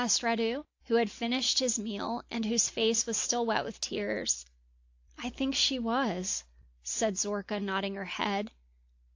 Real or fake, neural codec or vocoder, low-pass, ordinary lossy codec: real; none; 7.2 kHz; AAC, 48 kbps